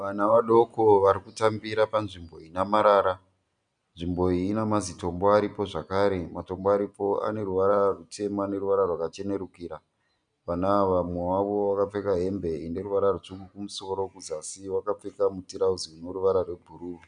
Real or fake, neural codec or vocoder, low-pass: real; none; 9.9 kHz